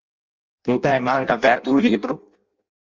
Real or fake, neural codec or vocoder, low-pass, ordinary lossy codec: fake; codec, 16 kHz in and 24 kHz out, 0.6 kbps, FireRedTTS-2 codec; 7.2 kHz; Opus, 32 kbps